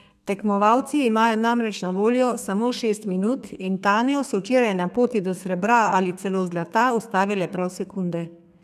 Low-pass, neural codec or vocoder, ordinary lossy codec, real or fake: 14.4 kHz; codec, 32 kHz, 1.9 kbps, SNAC; none; fake